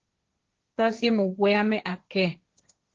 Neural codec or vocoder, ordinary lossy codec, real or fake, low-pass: codec, 16 kHz, 1.1 kbps, Voila-Tokenizer; Opus, 16 kbps; fake; 7.2 kHz